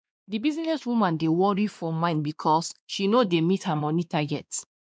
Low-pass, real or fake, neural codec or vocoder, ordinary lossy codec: none; fake; codec, 16 kHz, 2 kbps, X-Codec, WavLM features, trained on Multilingual LibriSpeech; none